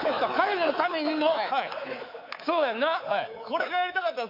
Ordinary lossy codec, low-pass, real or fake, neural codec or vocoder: MP3, 48 kbps; 5.4 kHz; fake; codec, 24 kHz, 3.1 kbps, DualCodec